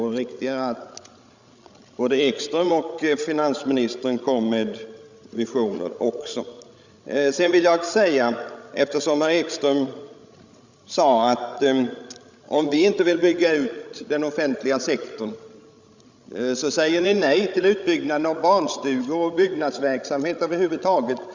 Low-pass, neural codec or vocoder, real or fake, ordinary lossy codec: 7.2 kHz; codec, 16 kHz, 16 kbps, FreqCodec, larger model; fake; Opus, 64 kbps